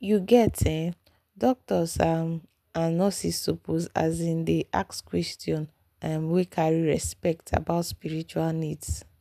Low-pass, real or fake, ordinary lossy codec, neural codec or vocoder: 14.4 kHz; real; none; none